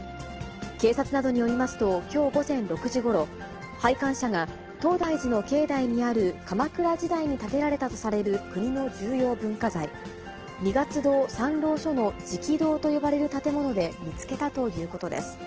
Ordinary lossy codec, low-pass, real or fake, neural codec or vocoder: Opus, 16 kbps; 7.2 kHz; real; none